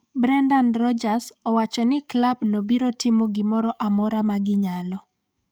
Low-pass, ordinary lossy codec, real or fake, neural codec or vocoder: none; none; fake; codec, 44.1 kHz, 7.8 kbps, DAC